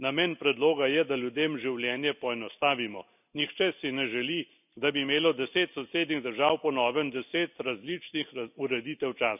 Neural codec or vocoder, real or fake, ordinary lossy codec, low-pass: none; real; none; 3.6 kHz